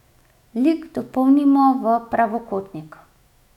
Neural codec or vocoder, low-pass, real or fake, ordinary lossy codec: autoencoder, 48 kHz, 128 numbers a frame, DAC-VAE, trained on Japanese speech; 19.8 kHz; fake; none